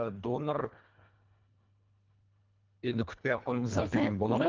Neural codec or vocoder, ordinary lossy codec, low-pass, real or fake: codec, 24 kHz, 1.5 kbps, HILCodec; Opus, 32 kbps; 7.2 kHz; fake